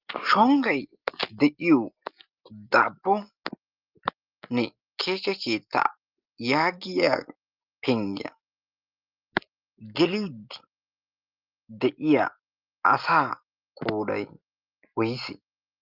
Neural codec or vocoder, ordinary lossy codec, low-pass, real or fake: none; Opus, 32 kbps; 5.4 kHz; real